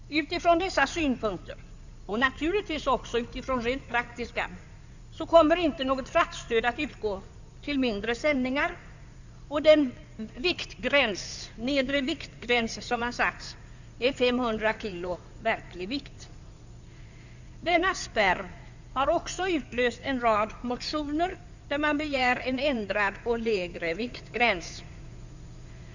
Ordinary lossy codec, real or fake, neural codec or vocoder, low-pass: none; fake; codec, 16 kHz in and 24 kHz out, 2.2 kbps, FireRedTTS-2 codec; 7.2 kHz